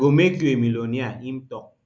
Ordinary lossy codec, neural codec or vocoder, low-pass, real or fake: none; none; none; real